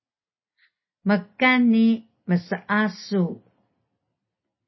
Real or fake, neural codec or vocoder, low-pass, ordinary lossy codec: real; none; 7.2 kHz; MP3, 24 kbps